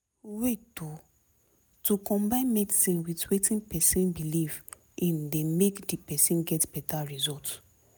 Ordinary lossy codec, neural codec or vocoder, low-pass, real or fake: none; none; none; real